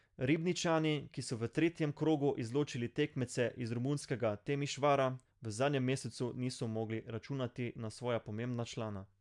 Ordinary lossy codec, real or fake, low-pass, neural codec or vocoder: MP3, 96 kbps; real; 10.8 kHz; none